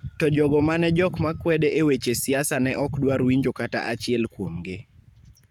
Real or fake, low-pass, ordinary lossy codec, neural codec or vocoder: fake; 19.8 kHz; none; codec, 44.1 kHz, 7.8 kbps, Pupu-Codec